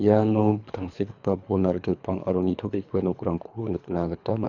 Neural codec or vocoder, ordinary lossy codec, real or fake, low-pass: codec, 24 kHz, 3 kbps, HILCodec; none; fake; 7.2 kHz